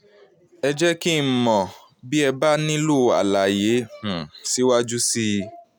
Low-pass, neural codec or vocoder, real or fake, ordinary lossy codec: none; none; real; none